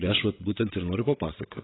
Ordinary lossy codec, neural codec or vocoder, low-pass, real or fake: AAC, 16 kbps; vocoder, 22.05 kHz, 80 mel bands, WaveNeXt; 7.2 kHz; fake